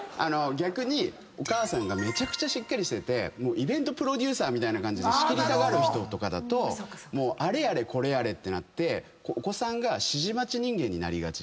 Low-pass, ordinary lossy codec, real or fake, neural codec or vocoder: none; none; real; none